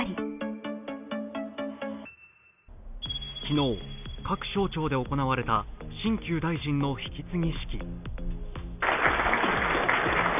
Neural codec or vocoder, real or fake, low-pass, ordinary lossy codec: none; real; 3.6 kHz; none